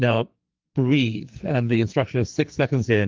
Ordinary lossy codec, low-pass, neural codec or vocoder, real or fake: Opus, 32 kbps; 7.2 kHz; codec, 44.1 kHz, 2.6 kbps, SNAC; fake